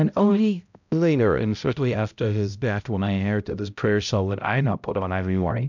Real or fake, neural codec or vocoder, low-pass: fake; codec, 16 kHz, 0.5 kbps, X-Codec, HuBERT features, trained on balanced general audio; 7.2 kHz